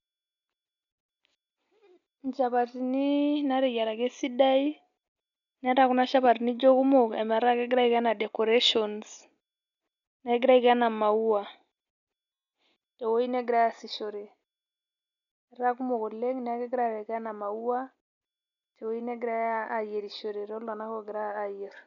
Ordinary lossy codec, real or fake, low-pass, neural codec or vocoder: none; real; 7.2 kHz; none